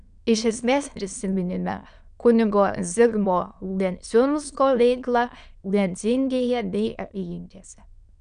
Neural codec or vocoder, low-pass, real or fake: autoencoder, 22.05 kHz, a latent of 192 numbers a frame, VITS, trained on many speakers; 9.9 kHz; fake